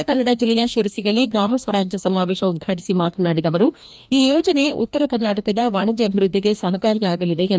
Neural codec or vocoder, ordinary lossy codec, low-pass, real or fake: codec, 16 kHz, 1 kbps, FreqCodec, larger model; none; none; fake